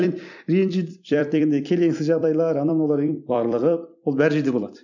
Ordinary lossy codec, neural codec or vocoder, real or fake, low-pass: none; vocoder, 44.1 kHz, 128 mel bands every 256 samples, BigVGAN v2; fake; 7.2 kHz